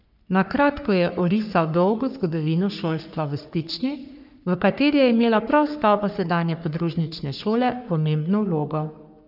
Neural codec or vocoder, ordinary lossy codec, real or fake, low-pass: codec, 44.1 kHz, 3.4 kbps, Pupu-Codec; none; fake; 5.4 kHz